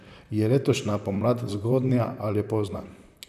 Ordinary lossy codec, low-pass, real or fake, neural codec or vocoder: none; 14.4 kHz; fake; vocoder, 44.1 kHz, 128 mel bands, Pupu-Vocoder